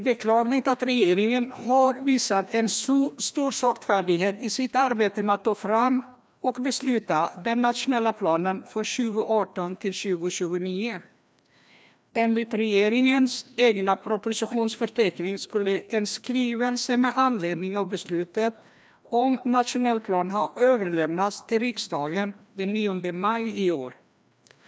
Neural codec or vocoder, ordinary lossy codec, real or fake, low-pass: codec, 16 kHz, 1 kbps, FreqCodec, larger model; none; fake; none